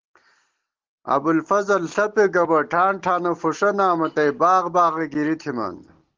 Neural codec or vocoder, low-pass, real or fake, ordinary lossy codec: none; 7.2 kHz; real; Opus, 16 kbps